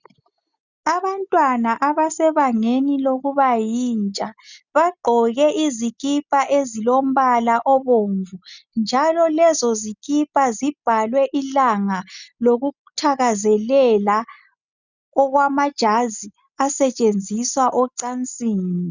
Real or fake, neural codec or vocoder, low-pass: real; none; 7.2 kHz